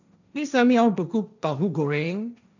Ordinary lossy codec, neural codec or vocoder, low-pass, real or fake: none; codec, 16 kHz, 1.1 kbps, Voila-Tokenizer; 7.2 kHz; fake